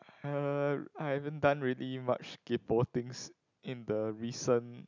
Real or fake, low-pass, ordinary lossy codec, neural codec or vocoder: real; 7.2 kHz; none; none